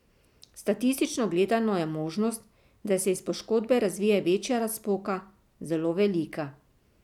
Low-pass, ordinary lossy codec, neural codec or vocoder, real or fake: 19.8 kHz; none; none; real